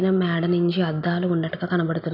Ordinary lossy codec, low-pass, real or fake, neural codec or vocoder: none; 5.4 kHz; real; none